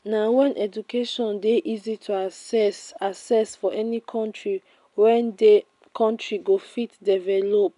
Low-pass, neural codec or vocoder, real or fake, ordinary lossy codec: 10.8 kHz; none; real; none